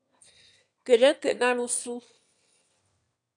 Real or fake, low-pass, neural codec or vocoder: fake; 9.9 kHz; autoencoder, 22.05 kHz, a latent of 192 numbers a frame, VITS, trained on one speaker